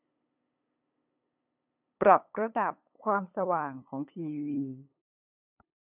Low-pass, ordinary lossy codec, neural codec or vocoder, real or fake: 3.6 kHz; none; codec, 16 kHz, 8 kbps, FunCodec, trained on LibriTTS, 25 frames a second; fake